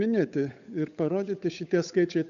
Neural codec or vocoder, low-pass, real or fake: codec, 16 kHz, 8 kbps, FunCodec, trained on Chinese and English, 25 frames a second; 7.2 kHz; fake